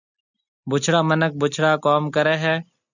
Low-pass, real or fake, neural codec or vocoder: 7.2 kHz; real; none